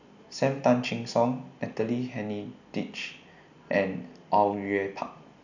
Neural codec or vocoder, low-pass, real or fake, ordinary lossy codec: none; 7.2 kHz; real; none